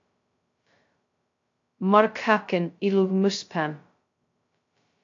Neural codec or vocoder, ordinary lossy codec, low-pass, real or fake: codec, 16 kHz, 0.2 kbps, FocalCodec; AAC, 48 kbps; 7.2 kHz; fake